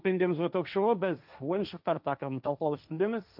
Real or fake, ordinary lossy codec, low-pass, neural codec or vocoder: fake; none; 5.4 kHz; codec, 16 kHz, 1.1 kbps, Voila-Tokenizer